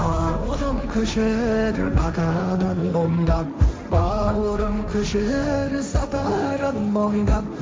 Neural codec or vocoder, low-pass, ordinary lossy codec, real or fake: codec, 16 kHz, 1.1 kbps, Voila-Tokenizer; none; none; fake